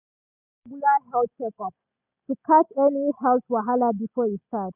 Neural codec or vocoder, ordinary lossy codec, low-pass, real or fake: none; none; 3.6 kHz; real